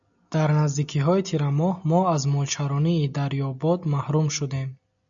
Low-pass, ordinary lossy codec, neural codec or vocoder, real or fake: 7.2 kHz; MP3, 96 kbps; none; real